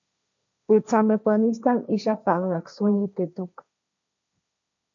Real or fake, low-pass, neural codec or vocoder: fake; 7.2 kHz; codec, 16 kHz, 1.1 kbps, Voila-Tokenizer